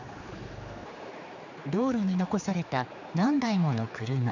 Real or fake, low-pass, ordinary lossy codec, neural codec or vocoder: fake; 7.2 kHz; none; codec, 16 kHz, 4 kbps, X-Codec, HuBERT features, trained on general audio